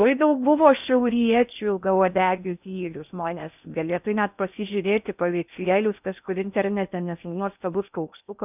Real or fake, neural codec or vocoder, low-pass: fake; codec, 16 kHz in and 24 kHz out, 0.6 kbps, FocalCodec, streaming, 4096 codes; 3.6 kHz